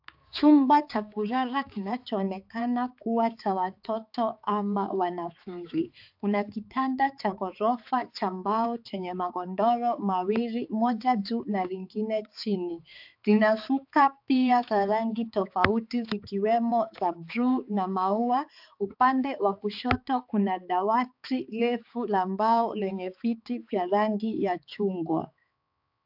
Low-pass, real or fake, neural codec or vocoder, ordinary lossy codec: 5.4 kHz; fake; codec, 16 kHz, 4 kbps, X-Codec, HuBERT features, trained on balanced general audio; AAC, 48 kbps